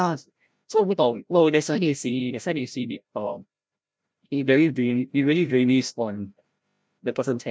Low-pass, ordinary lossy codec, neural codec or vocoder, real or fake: none; none; codec, 16 kHz, 0.5 kbps, FreqCodec, larger model; fake